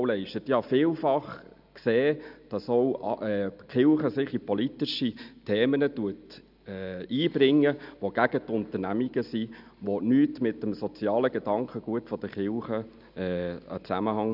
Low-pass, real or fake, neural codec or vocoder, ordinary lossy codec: 5.4 kHz; real; none; none